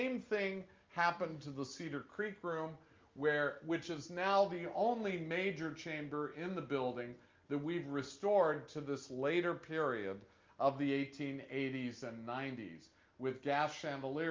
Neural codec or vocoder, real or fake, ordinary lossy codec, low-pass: none; real; Opus, 32 kbps; 7.2 kHz